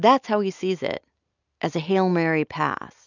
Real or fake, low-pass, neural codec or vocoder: real; 7.2 kHz; none